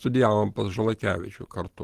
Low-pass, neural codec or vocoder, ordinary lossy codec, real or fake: 14.4 kHz; none; Opus, 16 kbps; real